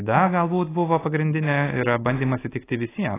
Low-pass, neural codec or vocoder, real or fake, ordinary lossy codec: 3.6 kHz; none; real; AAC, 16 kbps